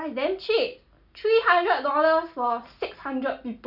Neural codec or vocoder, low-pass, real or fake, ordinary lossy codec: none; 5.4 kHz; real; none